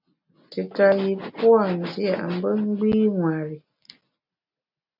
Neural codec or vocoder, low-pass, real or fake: none; 5.4 kHz; real